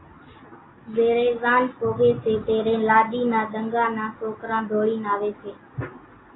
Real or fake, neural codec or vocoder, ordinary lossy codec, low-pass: real; none; AAC, 16 kbps; 7.2 kHz